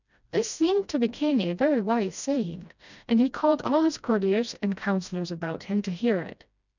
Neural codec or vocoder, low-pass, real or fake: codec, 16 kHz, 1 kbps, FreqCodec, smaller model; 7.2 kHz; fake